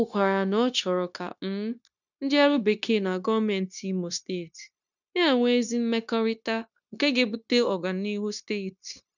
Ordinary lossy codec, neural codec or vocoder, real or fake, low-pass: none; codec, 16 kHz, 0.9 kbps, LongCat-Audio-Codec; fake; 7.2 kHz